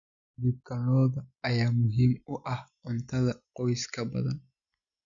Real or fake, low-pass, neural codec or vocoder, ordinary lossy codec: real; 7.2 kHz; none; none